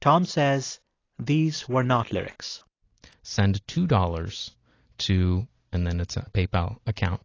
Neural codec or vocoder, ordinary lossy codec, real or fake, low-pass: none; AAC, 32 kbps; real; 7.2 kHz